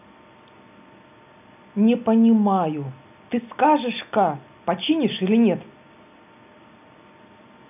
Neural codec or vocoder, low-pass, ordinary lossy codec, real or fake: none; 3.6 kHz; none; real